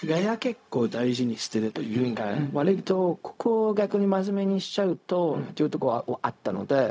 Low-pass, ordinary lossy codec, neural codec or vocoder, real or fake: none; none; codec, 16 kHz, 0.4 kbps, LongCat-Audio-Codec; fake